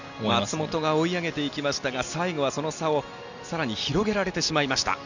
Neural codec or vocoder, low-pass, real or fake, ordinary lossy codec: none; 7.2 kHz; real; none